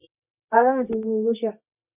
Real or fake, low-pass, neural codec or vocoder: fake; 3.6 kHz; codec, 24 kHz, 0.9 kbps, WavTokenizer, medium music audio release